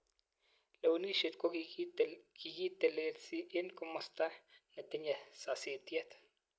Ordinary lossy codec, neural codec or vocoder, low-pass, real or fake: none; none; none; real